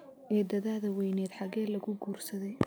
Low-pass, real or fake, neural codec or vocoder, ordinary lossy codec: none; real; none; none